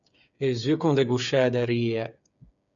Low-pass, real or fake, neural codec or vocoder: 7.2 kHz; fake; codec, 16 kHz, 8 kbps, FreqCodec, smaller model